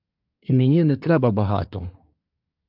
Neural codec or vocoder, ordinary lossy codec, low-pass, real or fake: codec, 24 kHz, 1 kbps, SNAC; AAC, 48 kbps; 5.4 kHz; fake